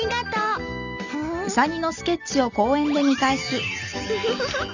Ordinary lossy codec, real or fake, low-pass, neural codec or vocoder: none; real; 7.2 kHz; none